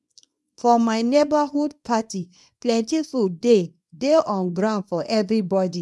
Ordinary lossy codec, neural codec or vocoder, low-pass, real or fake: none; codec, 24 kHz, 0.9 kbps, WavTokenizer, small release; none; fake